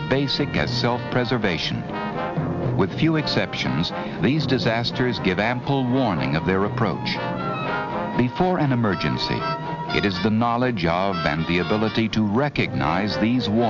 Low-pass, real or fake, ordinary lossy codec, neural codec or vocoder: 7.2 kHz; real; MP3, 64 kbps; none